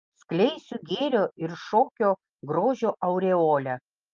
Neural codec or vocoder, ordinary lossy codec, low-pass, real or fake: none; Opus, 24 kbps; 7.2 kHz; real